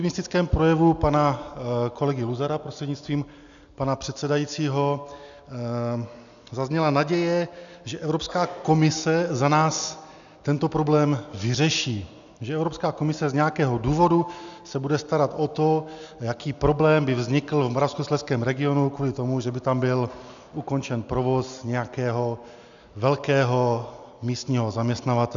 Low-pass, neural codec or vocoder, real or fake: 7.2 kHz; none; real